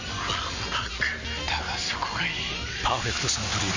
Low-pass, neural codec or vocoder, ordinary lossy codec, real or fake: 7.2 kHz; none; Opus, 64 kbps; real